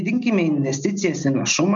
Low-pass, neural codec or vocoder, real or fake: 7.2 kHz; none; real